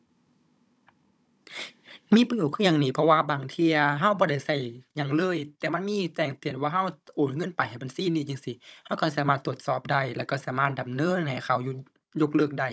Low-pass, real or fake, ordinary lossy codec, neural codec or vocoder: none; fake; none; codec, 16 kHz, 16 kbps, FunCodec, trained on Chinese and English, 50 frames a second